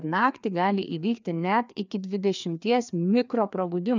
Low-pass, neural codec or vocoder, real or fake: 7.2 kHz; codec, 16 kHz, 2 kbps, FreqCodec, larger model; fake